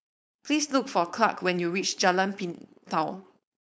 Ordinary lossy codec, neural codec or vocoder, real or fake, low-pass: none; codec, 16 kHz, 4.8 kbps, FACodec; fake; none